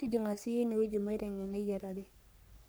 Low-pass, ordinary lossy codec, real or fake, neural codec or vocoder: none; none; fake; codec, 44.1 kHz, 3.4 kbps, Pupu-Codec